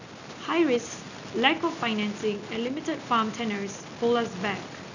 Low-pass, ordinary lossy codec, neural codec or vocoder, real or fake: 7.2 kHz; none; none; real